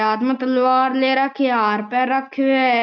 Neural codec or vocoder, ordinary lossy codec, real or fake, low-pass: none; none; real; 7.2 kHz